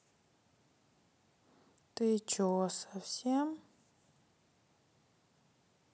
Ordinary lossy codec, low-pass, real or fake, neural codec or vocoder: none; none; real; none